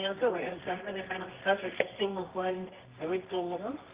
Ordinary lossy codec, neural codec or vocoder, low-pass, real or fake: Opus, 32 kbps; codec, 24 kHz, 0.9 kbps, WavTokenizer, medium music audio release; 3.6 kHz; fake